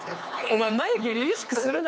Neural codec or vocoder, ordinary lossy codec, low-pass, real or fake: codec, 16 kHz, 4 kbps, X-Codec, HuBERT features, trained on LibriSpeech; none; none; fake